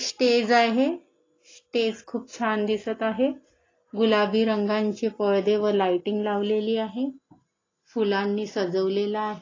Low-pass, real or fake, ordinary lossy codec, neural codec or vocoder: 7.2 kHz; real; AAC, 32 kbps; none